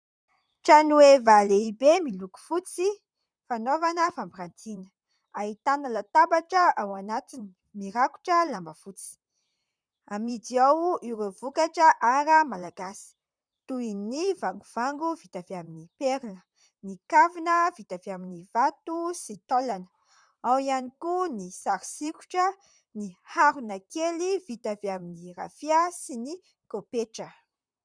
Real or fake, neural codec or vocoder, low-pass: fake; vocoder, 44.1 kHz, 128 mel bands, Pupu-Vocoder; 9.9 kHz